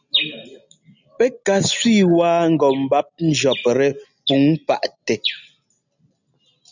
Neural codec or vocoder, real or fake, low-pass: none; real; 7.2 kHz